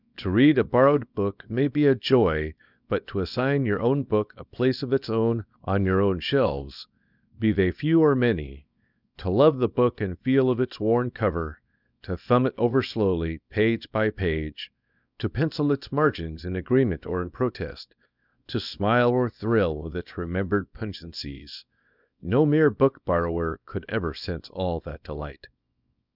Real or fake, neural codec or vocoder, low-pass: fake; codec, 24 kHz, 0.9 kbps, WavTokenizer, small release; 5.4 kHz